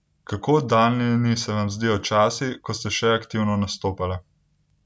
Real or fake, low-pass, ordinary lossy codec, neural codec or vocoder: real; none; none; none